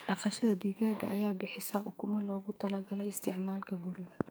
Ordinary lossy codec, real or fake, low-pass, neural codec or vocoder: none; fake; none; codec, 44.1 kHz, 2.6 kbps, SNAC